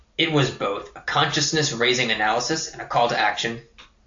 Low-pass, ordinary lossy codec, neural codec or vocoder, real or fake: 7.2 kHz; AAC, 48 kbps; none; real